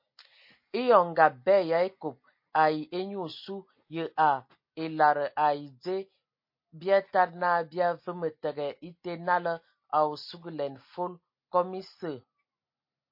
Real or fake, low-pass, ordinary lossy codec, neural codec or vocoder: real; 5.4 kHz; MP3, 32 kbps; none